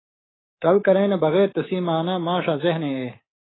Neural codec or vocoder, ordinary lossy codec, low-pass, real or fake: codec, 24 kHz, 3.1 kbps, DualCodec; AAC, 16 kbps; 7.2 kHz; fake